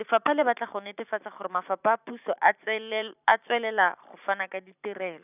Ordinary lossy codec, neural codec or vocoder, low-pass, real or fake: none; vocoder, 44.1 kHz, 128 mel bands every 512 samples, BigVGAN v2; 3.6 kHz; fake